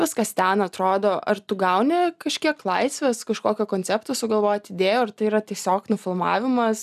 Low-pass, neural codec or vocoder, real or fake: 14.4 kHz; none; real